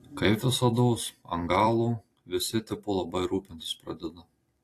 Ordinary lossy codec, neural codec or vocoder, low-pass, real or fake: AAC, 48 kbps; vocoder, 44.1 kHz, 128 mel bands every 256 samples, BigVGAN v2; 14.4 kHz; fake